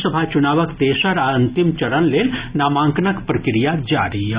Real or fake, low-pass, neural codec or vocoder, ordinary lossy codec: real; 3.6 kHz; none; Opus, 64 kbps